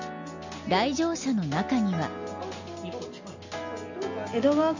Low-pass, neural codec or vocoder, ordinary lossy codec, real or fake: 7.2 kHz; none; none; real